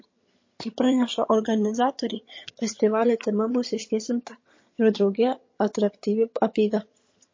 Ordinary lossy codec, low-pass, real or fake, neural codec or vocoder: MP3, 32 kbps; 7.2 kHz; fake; vocoder, 22.05 kHz, 80 mel bands, HiFi-GAN